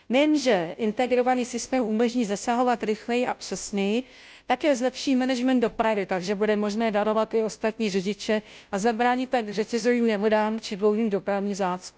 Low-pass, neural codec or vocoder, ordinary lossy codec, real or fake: none; codec, 16 kHz, 0.5 kbps, FunCodec, trained on Chinese and English, 25 frames a second; none; fake